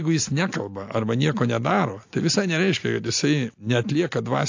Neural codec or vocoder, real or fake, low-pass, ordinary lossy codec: none; real; 7.2 kHz; AAC, 48 kbps